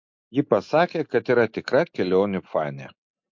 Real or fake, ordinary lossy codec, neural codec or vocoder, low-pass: real; MP3, 48 kbps; none; 7.2 kHz